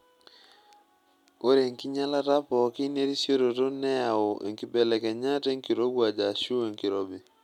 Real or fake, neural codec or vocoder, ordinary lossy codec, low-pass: real; none; none; 19.8 kHz